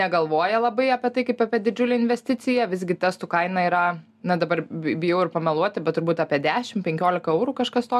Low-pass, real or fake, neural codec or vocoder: 14.4 kHz; real; none